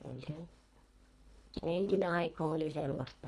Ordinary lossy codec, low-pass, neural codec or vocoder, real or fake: none; none; codec, 24 kHz, 1.5 kbps, HILCodec; fake